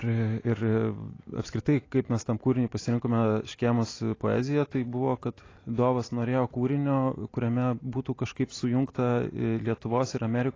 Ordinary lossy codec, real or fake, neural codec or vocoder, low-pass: AAC, 32 kbps; real; none; 7.2 kHz